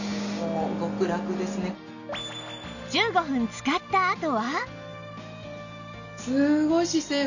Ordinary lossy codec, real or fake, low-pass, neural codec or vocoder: none; real; 7.2 kHz; none